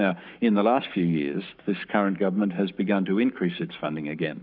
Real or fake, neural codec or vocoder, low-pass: fake; codec, 44.1 kHz, 7.8 kbps, Pupu-Codec; 5.4 kHz